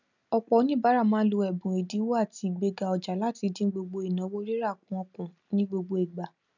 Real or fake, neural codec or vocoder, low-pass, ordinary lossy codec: real; none; 7.2 kHz; none